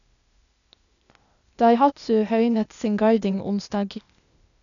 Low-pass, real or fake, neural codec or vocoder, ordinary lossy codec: 7.2 kHz; fake; codec, 16 kHz, 0.8 kbps, ZipCodec; none